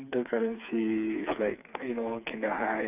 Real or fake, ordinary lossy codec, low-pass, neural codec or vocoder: fake; none; 3.6 kHz; codec, 16 kHz, 4 kbps, FreqCodec, smaller model